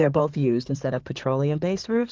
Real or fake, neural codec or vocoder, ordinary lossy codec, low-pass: fake; codec, 16 kHz in and 24 kHz out, 2.2 kbps, FireRedTTS-2 codec; Opus, 24 kbps; 7.2 kHz